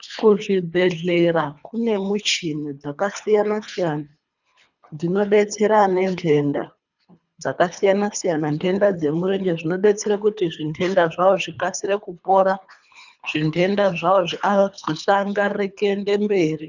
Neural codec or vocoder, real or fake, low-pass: codec, 24 kHz, 3 kbps, HILCodec; fake; 7.2 kHz